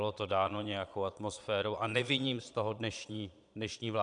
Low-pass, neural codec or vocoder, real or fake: 9.9 kHz; vocoder, 22.05 kHz, 80 mel bands, Vocos; fake